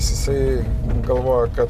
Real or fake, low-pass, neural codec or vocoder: real; 14.4 kHz; none